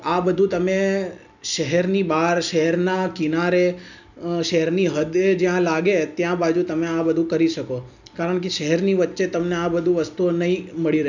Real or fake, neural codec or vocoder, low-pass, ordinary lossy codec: real; none; 7.2 kHz; none